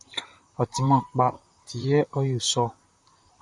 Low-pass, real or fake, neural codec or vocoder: 10.8 kHz; fake; vocoder, 44.1 kHz, 128 mel bands, Pupu-Vocoder